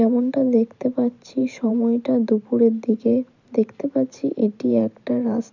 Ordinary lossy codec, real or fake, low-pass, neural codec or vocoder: none; fake; 7.2 kHz; vocoder, 44.1 kHz, 128 mel bands every 512 samples, BigVGAN v2